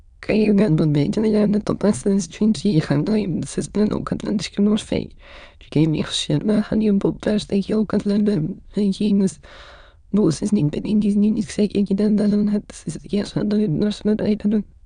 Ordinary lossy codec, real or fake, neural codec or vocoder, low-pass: none; fake; autoencoder, 22.05 kHz, a latent of 192 numbers a frame, VITS, trained on many speakers; 9.9 kHz